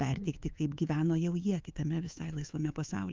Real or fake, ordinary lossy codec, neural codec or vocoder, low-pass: fake; Opus, 24 kbps; codec, 24 kHz, 3.1 kbps, DualCodec; 7.2 kHz